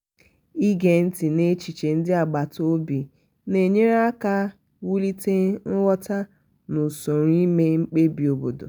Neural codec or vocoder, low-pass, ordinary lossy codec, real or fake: none; none; none; real